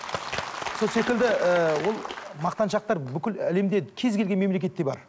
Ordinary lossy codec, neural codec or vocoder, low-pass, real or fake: none; none; none; real